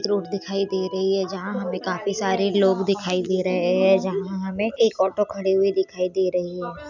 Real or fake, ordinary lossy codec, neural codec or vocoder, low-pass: real; none; none; 7.2 kHz